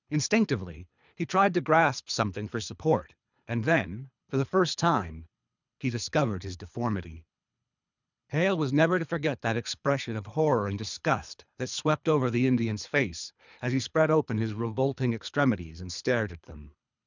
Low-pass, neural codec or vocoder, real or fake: 7.2 kHz; codec, 24 kHz, 3 kbps, HILCodec; fake